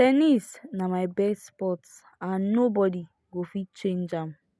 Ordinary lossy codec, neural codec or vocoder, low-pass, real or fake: none; none; none; real